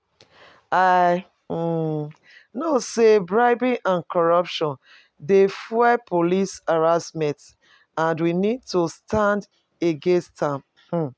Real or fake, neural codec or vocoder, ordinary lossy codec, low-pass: real; none; none; none